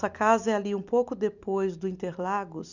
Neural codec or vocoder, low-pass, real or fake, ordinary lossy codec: none; 7.2 kHz; real; none